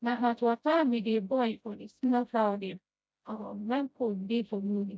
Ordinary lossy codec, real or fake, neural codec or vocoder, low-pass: none; fake; codec, 16 kHz, 0.5 kbps, FreqCodec, smaller model; none